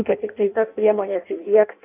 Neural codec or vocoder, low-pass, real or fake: codec, 16 kHz in and 24 kHz out, 0.6 kbps, FireRedTTS-2 codec; 3.6 kHz; fake